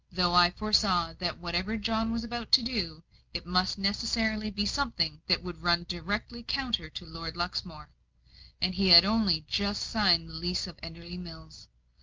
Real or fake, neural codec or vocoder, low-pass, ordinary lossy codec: real; none; 7.2 kHz; Opus, 24 kbps